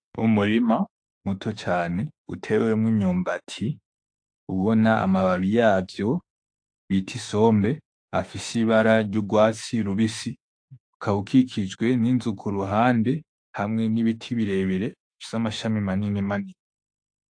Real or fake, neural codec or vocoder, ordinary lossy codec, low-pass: fake; autoencoder, 48 kHz, 32 numbers a frame, DAC-VAE, trained on Japanese speech; AAC, 48 kbps; 9.9 kHz